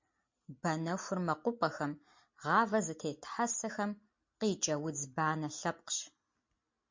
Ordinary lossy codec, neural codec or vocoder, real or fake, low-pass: MP3, 48 kbps; none; real; 7.2 kHz